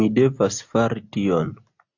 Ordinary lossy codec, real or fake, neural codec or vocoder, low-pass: AAC, 48 kbps; real; none; 7.2 kHz